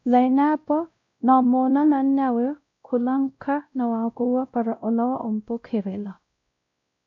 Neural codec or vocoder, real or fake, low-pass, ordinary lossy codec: codec, 16 kHz, 0.5 kbps, X-Codec, WavLM features, trained on Multilingual LibriSpeech; fake; 7.2 kHz; AAC, 48 kbps